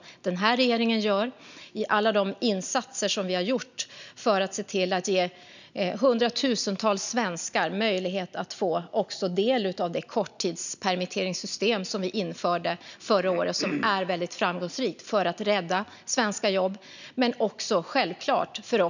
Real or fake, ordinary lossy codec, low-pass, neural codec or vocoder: real; none; 7.2 kHz; none